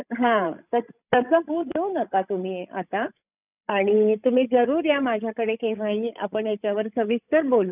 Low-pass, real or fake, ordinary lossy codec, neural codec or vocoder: 3.6 kHz; fake; none; codec, 16 kHz, 16 kbps, FreqCodec, larger model